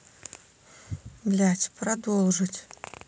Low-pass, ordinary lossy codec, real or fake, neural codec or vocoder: none; none; real; none